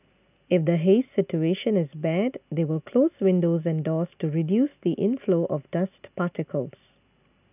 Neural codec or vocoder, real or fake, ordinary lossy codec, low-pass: none; real; none; 3.6 kHz